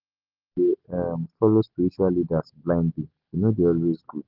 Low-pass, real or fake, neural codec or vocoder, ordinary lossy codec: 5.4 kHz; real; none; Opus, 16 kbps